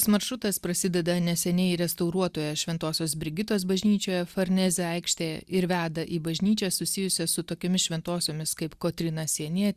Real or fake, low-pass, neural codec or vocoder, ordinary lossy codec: real; 14.4 kHz; none; Opus, 64 kbps